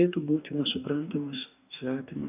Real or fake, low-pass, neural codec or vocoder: fake; 3.6 kHz; codec, 44.1 kHz, 2.6 kbps, DAC